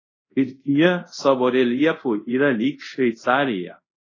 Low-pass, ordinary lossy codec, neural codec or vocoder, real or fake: 7.2 kHz; AAC, 32 kbps; codec, 24 kHz, 0.5 kbps, DualCodec; fake